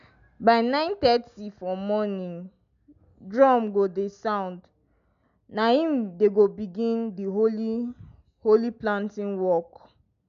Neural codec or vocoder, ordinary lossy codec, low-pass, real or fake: none; none; 7.2 kHz; real